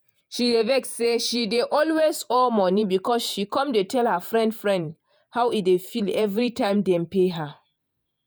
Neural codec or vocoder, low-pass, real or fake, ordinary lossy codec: vocoder, 48 kHz, 128 mel bands, Vocos; none; fake; none